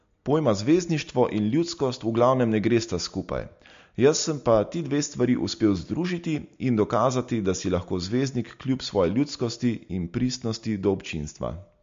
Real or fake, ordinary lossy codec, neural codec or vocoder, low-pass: real; MP3, 48 kbps; none; 7.2 kHz